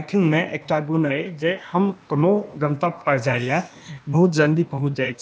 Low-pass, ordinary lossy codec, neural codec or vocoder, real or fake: none; none; codec, 16 kHz, 0.8 kbps, ZipCodec; fake